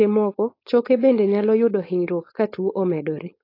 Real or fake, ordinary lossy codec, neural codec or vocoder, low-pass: fake; AAC, 24 kbps; codec, 16 kHz, 4.8 kbps, FACodec; 5.4 kHz